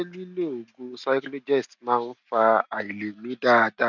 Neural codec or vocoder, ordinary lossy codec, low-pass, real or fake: none; none; 7.2 kHz; real